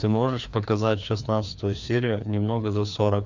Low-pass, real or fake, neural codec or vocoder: 7.2 kHz; fake; codec, 16 kHz, 2 kbps, FreqCodec, larger model